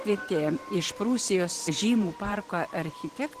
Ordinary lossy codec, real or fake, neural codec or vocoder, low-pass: Opus, 16 kbps; fake; vocoder, 44.1 kHz, 128 mel bands every 512 samples, BigVGAN v2; 14.4 kHz